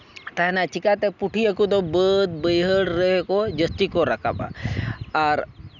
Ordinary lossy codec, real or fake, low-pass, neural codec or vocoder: none; real; 7.2 kHz; none